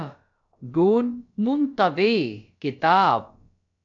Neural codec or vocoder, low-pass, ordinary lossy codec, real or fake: codec, 16 kHz, about 1 kbps, DyCAST, with the encoder's durations; 7.2 kHz; AAC, 64 kbps; fake